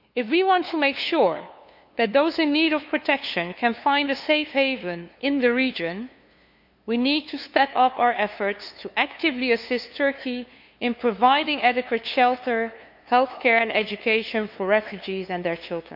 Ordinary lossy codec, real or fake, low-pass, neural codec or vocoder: none; fake; 5.4 kHz; codec, 16 kHz, 2 kbps, FunCodec, trained on LibriTTS, 25 frames a second